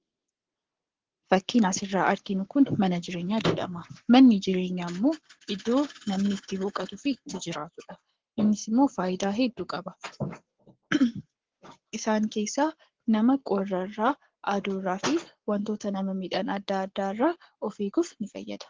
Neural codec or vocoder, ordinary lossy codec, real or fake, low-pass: codec, 44.1 kHz, 7.8 kbps, Pupu-Codec; Opus, 16 kbps; fake; 7.2 kHz